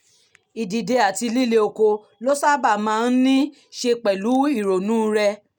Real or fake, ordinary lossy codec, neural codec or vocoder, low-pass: real; none; none; none